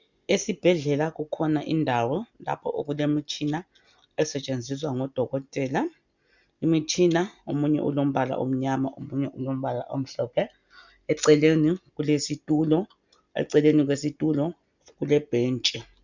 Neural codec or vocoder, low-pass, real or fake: none; 7.2 kHz; real